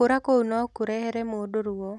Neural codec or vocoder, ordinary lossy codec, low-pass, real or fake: none; none; none; real